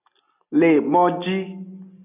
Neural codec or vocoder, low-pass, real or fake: none; 3.6 kHz; real